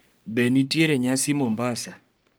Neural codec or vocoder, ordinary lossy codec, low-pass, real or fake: codec, 44.1 kHz, 3.4 kbps, Pupu-Codec; none; none; fake